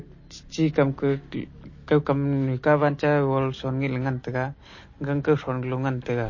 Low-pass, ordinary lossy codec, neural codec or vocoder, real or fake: 7.2 kHz; MP3, 32 kbps; none; real